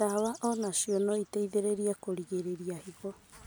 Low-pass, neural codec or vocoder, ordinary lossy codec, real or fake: none; none; none; real